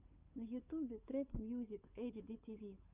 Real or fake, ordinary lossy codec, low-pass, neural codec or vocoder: fake; Opus, 64 kbps; 3.6 kHz; codec, 16 kHz, 8 kbps, FunCodec, trained on Chinese and English, 25 frames a second